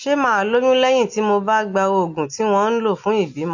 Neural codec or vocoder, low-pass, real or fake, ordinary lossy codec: none; 7.2 kHz; real; MP3, 48 kbps